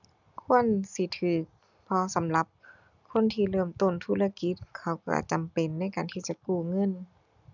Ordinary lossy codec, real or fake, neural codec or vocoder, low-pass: none; real; none; 7.2 kHz